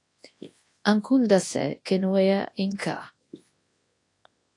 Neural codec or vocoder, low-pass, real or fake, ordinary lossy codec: codec, 24 kHz, 0.9 kbps, WavTokenizer, large speech release; 10.8 kHz; fake; AAC, 48 kbps